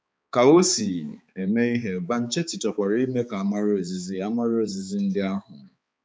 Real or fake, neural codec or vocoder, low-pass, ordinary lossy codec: fake; codec, 16 kHz, 4 kbps, X-Codec, HuBERT features, trained on balanced general audio; none; none